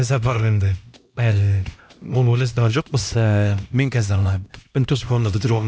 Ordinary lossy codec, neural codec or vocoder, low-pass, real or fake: none; codec, 16 kHz, 1 kbps, X-Codec, HuBERT features, trained on LibriSpeech; none; fake